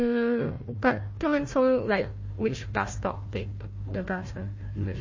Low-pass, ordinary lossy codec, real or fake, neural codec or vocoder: 7.2 kHz; MP3, 32 kbps; fake; codec, 16 kHz, 1 kbps, FunCodec, trained on Chinese and English, 50 frames a second